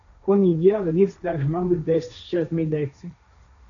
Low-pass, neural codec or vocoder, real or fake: 7.2 kHz; codec, 16 kHz, 1.1 kbps, Voila-Tokenizer; fake